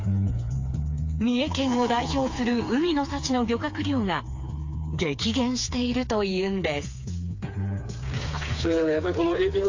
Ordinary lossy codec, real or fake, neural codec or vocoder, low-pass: AAC, 48 kbps; fake; codec, 16 kHz, 4 kbps, FreqCodec, smaller model; 7.2 kHz